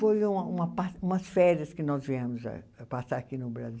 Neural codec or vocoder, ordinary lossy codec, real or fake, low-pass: none; none; real; none